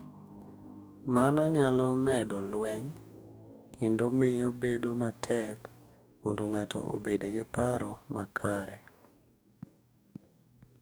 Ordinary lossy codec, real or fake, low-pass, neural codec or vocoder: none; fake; none; codec, 44.1 kHz, 2.6 kbps, DAC